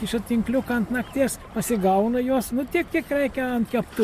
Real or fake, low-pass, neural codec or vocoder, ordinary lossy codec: real; 14.4 kHz; none; MP3, 64 kbps